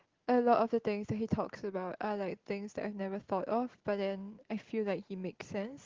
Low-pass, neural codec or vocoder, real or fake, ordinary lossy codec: 7.2 kHz; none; real; Opus, 16 kbps